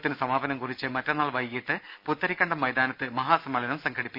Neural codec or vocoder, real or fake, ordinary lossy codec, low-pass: none; real; none; 5.4 kHz